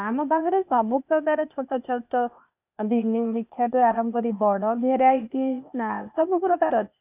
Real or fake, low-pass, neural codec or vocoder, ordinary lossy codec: fake; 3.6 kHz; codec, 16 kHz, 0.8 kbps, ZipCodec; none